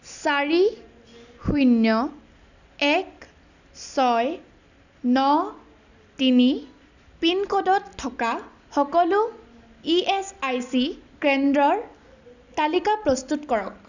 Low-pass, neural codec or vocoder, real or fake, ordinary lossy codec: 7.2 kHz; none; real; none